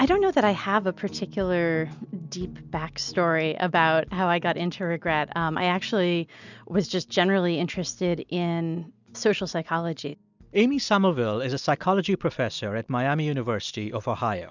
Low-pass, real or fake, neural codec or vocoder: 7.2 kHz; real; none